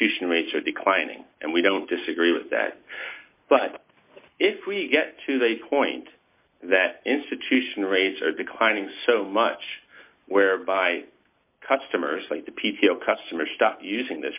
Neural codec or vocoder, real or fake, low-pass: none; real; 3.6 kHz